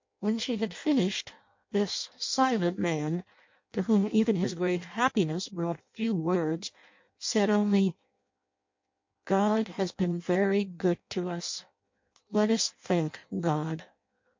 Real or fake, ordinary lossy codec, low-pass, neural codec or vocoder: fake; MP3, 48 kbps; 7.2 kHz; codec, 16 kHz in and 24 kHz out, 0.6 kbps, FireRedTTS-2 codec